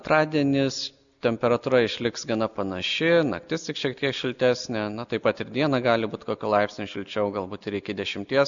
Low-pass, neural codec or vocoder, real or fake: 7.2 kHz; none; real